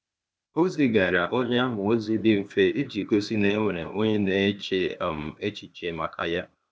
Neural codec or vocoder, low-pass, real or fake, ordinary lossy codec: codec, 16 kHz, 0.8 kbps, ZipCodec; none; fake; none